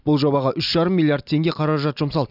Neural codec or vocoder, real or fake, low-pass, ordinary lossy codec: none; real; 5.4 kHz; none